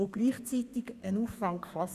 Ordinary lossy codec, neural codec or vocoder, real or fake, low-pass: Opus, 64 kbps; codec, 32 kHz, 1.9 kbps, SNAC; fake; 14.4 kHz